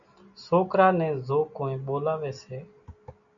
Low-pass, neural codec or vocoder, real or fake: 7.2 kHz; none; real